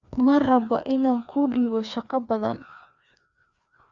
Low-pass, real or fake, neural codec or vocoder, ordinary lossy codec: 7.2 kHz; fake; codec, 16 kHz, 2 kbps, FreqCodec, larger model; Opus, 64 kbps